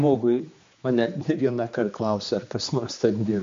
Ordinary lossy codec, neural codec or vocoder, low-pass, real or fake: MP3, 48 kbps; codec, 16 kHz, 2 kbps, X-Codec, HuBERT features, trained on general audio; 7.2 kHz; fake